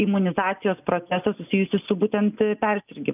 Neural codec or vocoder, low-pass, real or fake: none; 3.6 kHz; real